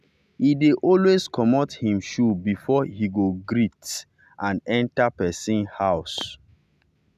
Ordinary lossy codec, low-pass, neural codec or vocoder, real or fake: none; 14.4 kHz; none; real